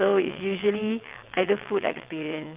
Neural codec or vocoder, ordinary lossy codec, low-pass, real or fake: vocoder, 22.05 kHz, 80 mel bands, WaveNeXt; Opus, 24 kbps; 3.6 kHz; fake